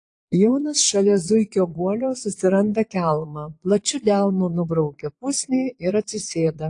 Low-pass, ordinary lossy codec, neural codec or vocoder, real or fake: 9.9 kHz; AAC, 48 kbps; vocoder, 22.05 kHz, 80 mel bands, Vocos; fake